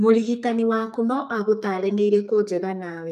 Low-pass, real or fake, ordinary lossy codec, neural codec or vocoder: 14.4 kHz; fake; none; codec, 32 kHz, 1.9 kbps, SNAC